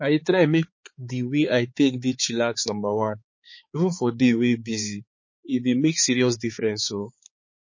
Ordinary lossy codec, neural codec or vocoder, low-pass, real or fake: MP3, 32 kbps; codec, 16 kHz, 4 kbps, X-Codec, HuBERT features, trained on balanced general audio; 7.2 kHz; fake